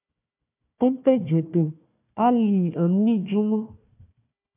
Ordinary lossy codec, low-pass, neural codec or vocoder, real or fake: AAC, 32 kbps; 3.6 kHz; codec, 16 kHz, 1 kbps, FunCodec, trained on Chinese and English, 50 frames a second; fake